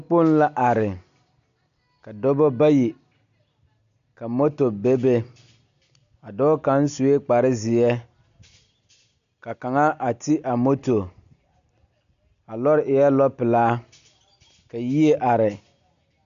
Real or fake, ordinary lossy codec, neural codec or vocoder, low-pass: real; MP3, 64 kbps; none; 7.2 kHz